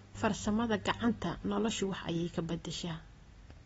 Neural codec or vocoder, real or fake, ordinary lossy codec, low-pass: none; real; AAC, 24 kbps; 19.8 kHz